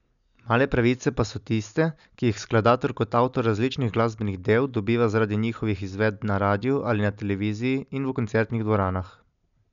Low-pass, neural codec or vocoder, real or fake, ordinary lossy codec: 7.2 kHz; none; real; none